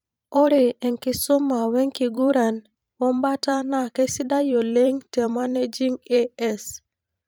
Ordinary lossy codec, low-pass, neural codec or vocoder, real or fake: none; none; vocoder, 44.1 kHz, 128 mel bands every 512 samples, BigVGAN v2; fake